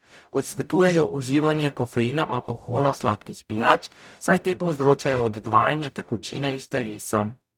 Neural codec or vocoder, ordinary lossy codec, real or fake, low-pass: codec, 44.1 kHz, 0.9 kbps, DAC; none; fake; 19.8 kHz